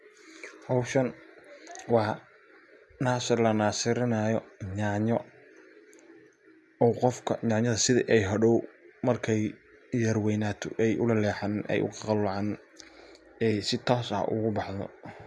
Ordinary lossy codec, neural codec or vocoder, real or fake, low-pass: none; none; real; none